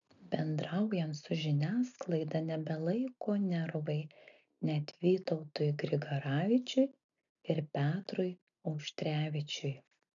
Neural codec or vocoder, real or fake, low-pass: none; real; 7.2 kHz